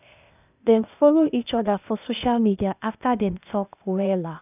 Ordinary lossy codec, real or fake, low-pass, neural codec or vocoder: none; fake; 3.6 kHz; codec, 16 kHz, 0.8 kbps, ZipCodec